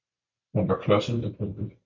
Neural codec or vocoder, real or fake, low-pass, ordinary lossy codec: vocoder, 44.1 kHz, 128 mel bands every 256 samples, BigVGAN v2; fake; 7.2 kHz; MP3, 64 kbps